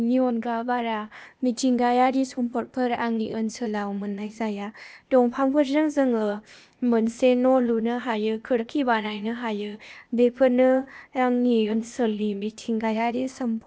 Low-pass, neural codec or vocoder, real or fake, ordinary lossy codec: none; codec, 16 kHz, 0.8 kbps, ZipCodec; fake; none